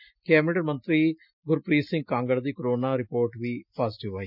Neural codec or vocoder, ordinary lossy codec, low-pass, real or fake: none; none; 5.4 kHz; real